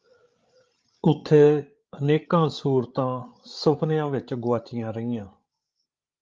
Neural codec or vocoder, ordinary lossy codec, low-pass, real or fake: codec, 16 kHz, 8 kbps, FreqCodec, larger model; Opus, 32 kbps; 7.2 kHz; fake